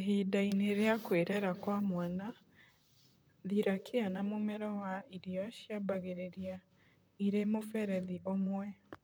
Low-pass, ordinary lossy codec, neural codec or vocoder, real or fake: none; none; vocoder, 44.1 kHz, 128 mel bands, Pupu-Vocoder; fake